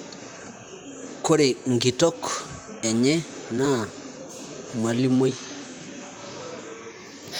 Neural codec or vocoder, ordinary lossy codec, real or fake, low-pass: vocoder, 44.1 kHz, 128 mel bands, Pupu-Vocoder; none; fake; none